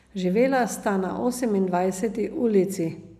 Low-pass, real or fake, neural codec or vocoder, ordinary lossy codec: 14.4 kHz; real; none; none